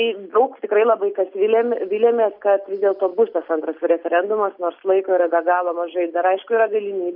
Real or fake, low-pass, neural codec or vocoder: real; 5.4 kHz; none